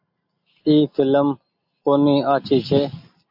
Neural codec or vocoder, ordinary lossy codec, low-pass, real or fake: none; AAC, 48 kbps; 5.4 kHz; real